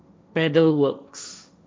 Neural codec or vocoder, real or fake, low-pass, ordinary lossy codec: codec, 16 kHz, 1.1 kbps, Voila-Tokenizer; fake; none; none